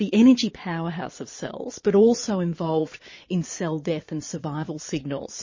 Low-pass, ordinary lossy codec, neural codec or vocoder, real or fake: 7.2 kHz; MP3, 32 kbps; vocoder, 22.05 kHz, 80 mel bands, Vocos; fake